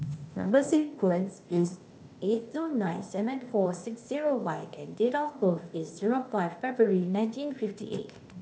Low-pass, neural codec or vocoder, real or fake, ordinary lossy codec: none; codec, 16 kHz, 0.8 kbps, ZipCodec; fake; none